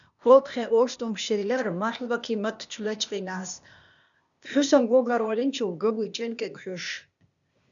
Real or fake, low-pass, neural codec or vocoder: fake; 7.2 kHz; codec, 16 kHz, 0.8 kbps, ZipCodec